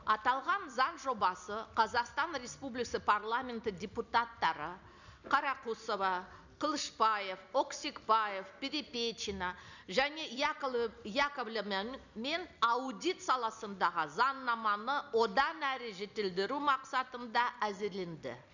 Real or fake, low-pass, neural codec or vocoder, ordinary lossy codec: real; 7.2 kHz; none; none